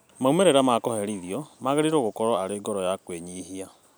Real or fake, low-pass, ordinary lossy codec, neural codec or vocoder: real; none; none; none